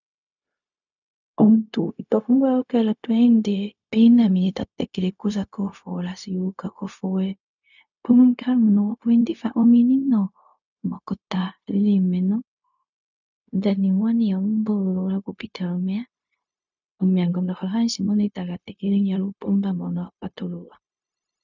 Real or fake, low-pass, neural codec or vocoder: fake; 7.2 kHz; codec, 16 kHz, 0.4 kbps, LongCat-Audio-Codec